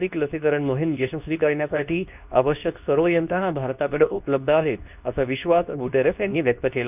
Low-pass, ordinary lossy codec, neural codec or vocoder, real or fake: 3.6 kHz; none; codec, 24 kHz, 0.9 kbps, WavTokenizer, medium speech release version 2; fake